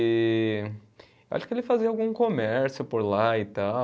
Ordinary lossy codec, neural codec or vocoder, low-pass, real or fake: none; none; none; real